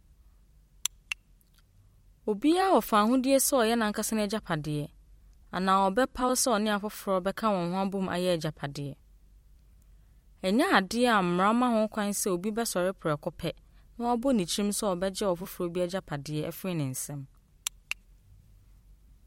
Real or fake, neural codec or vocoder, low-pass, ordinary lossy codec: fake; vocoder, 44.1 kHz, 128 mel bands every 256 samples, BigVGAN v2; 19.8 kHz; MP3, 64 kbps